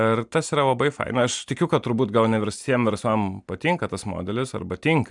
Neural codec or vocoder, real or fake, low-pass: none; real; 10.8 kHz